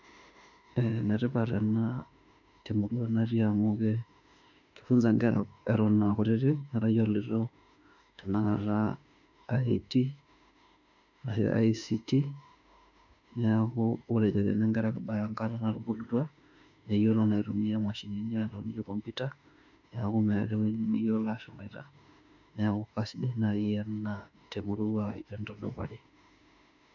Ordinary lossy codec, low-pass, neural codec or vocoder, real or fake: none; 7.2 kHz; autoencoder, 48 kHz, 32 numbers a frame, DAC-VAE, trained on Japanese speech; fake